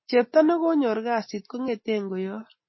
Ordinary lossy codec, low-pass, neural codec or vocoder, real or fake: MP3, 24 kbps; 7.2 kHz; none; real